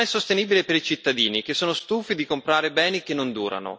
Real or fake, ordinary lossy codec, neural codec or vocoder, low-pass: real; none; none; none